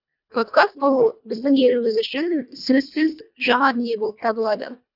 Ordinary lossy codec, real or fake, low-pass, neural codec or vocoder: none; fake; 5.4 kHz; codec, 24 kHz, 1.5 kbps, HILCodec